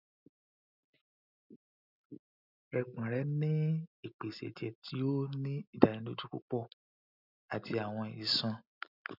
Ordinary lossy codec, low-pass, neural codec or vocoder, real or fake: none; 5.4 kHz; none; real